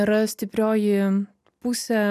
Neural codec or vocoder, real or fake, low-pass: none; real; 14.4 kHz